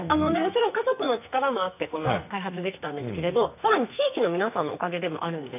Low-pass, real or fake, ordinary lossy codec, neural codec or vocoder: 3.6 kHz; fake; none; codec, 44.1 kHz, 2.6 kbps, SNAC